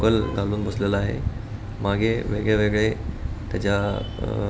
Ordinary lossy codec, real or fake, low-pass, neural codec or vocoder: none; real; none; none